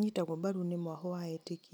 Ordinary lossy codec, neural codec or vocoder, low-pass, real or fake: none; none; none; real